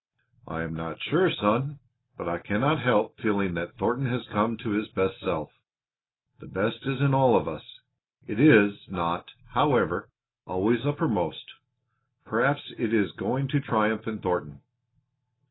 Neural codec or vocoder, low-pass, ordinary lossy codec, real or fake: none; 7.2 kHz; AAC, 16 kbps; real